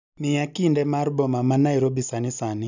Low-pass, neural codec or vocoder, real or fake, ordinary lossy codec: 7.2 kHz; none; real; none